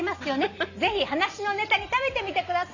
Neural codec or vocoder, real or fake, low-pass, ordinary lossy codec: none; real; 7.2 kHz; none